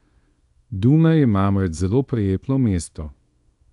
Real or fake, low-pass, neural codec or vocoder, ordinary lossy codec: fake; 10.8 kHz; codec, 24 kHz, 0.9 kbps, WavTokenizer, small release; none